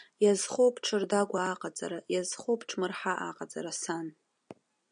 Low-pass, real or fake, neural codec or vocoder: 9.9 kHz; real; none